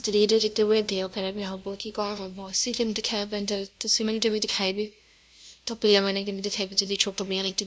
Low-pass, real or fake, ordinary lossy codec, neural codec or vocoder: none; fake; none; codec, 16 kHz, 0.5 kbps, FunCodec, trained on LibriTTS, 25 frames a second